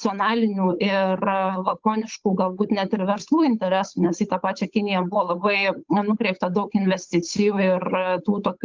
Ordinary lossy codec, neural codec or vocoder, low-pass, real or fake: Opus, 24 kbps; codec, 16 kHz, 8 kbps, FunCodec, trained on Chinese and English, 25 frames a second; 7.2 kHz; fake